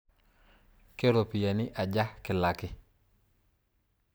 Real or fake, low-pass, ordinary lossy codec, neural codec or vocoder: real; none; none; none